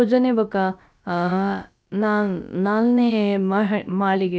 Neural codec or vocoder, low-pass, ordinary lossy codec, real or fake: codec, 16 kHz, about 1 kbps, DyCAST, with the encoder's durations; none; none; fake